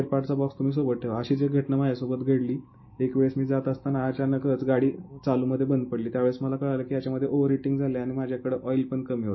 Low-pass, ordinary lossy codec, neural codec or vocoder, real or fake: 7.2 kHz; MP3, 24 kbps; none; real